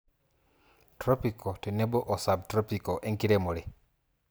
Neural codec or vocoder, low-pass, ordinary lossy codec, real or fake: none; none; none; real